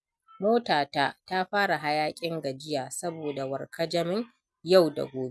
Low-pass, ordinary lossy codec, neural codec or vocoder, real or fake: none; none; none; real